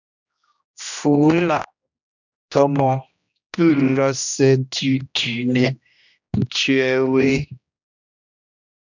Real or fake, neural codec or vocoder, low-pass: fake; codec, 16 kHz, 1 kbps, X-Codec, HuBERT features, trained on general audio; 7.2 kHz